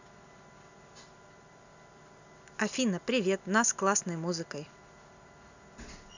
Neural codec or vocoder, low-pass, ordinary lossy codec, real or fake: none; 7.2 kHz; none; real